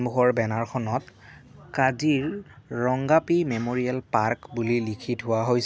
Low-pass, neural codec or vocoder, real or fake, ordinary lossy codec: none; none; real; none